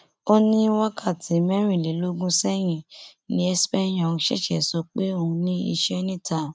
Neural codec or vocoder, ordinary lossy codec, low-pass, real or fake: none; none; none; real